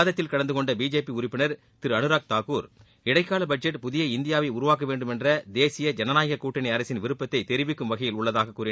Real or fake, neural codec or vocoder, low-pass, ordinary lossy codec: real; none; none; none